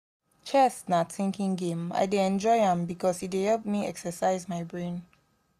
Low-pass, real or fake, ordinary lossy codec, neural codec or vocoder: 14.4 kHz; real; none; none